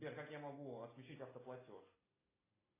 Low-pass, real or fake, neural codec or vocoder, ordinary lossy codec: 3.6 kHz; real; none; AAC, 16 kbps